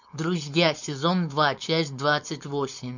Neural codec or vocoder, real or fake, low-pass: codec, 16 kHz, 4.8 kbps, FACodec; fake; 7.2 kHz